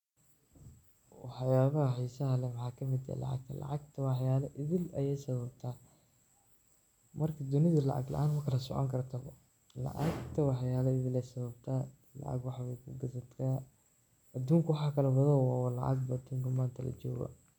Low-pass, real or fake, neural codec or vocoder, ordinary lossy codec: 19.8 kHz; real; none; MP3, 96 kbps